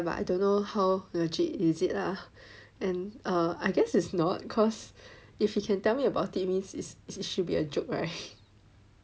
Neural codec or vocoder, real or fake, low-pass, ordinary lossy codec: none; real; none; none